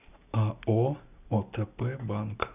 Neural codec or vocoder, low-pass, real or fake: none; 3.6 kHz; real